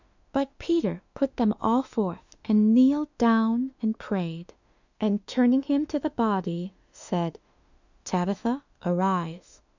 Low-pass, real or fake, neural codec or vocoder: 7.2 kHz; fake; autoencoder, 48 kHz, 32 numbers a frame, DAC-VAE, trained on Japanese speech